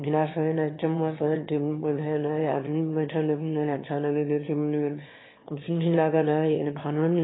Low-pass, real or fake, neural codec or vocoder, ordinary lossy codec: 7.2 kHz; fake; autoencoder, 22.05 kHz, a latent of 192 numbers a frame, VITS, trained on one speaker; AAC, 16 kbps